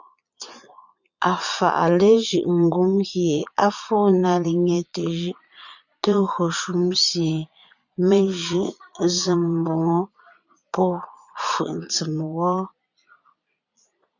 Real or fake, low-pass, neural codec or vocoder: fake; 7.2 kHz; vocoder, 22.05 kHz, 80 mel bands, Vocos